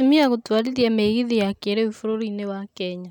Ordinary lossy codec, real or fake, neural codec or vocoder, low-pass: none; real; none; 19.8 kHz